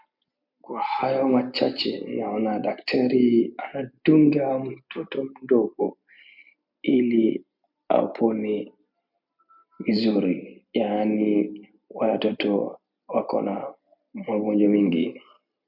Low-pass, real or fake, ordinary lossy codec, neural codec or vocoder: 5.4 kHz; real; MP3, 48 kbps; none